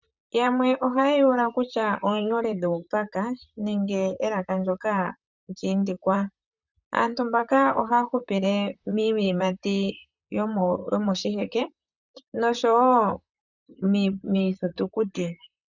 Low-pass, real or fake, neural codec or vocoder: 7.2 kHz; fake; vocoder, 44.1 kHz, 128 mel bands, Pupu-Vocoder